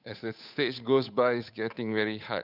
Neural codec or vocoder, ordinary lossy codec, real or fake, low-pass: codec, 16 kHz, 8 kbps, FunCodec, trained on Chinese and English, 25 frames a second; none; fake; 5.4 kHz